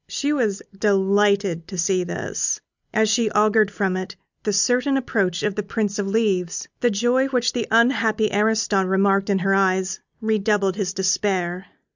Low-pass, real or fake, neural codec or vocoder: 7.2 kHz; real; none